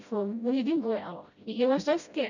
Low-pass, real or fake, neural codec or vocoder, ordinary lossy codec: 7.2 kHz; fake; codec, 16 kHz, 0.5 kbps, FreqCodec, smaller model; none